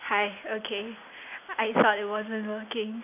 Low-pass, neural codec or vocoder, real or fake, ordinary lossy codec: 3.6 kHz; none; real; none